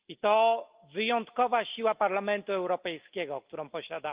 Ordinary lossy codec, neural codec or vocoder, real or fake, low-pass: Opus, 32 kbps; none; real; 3.6 kHz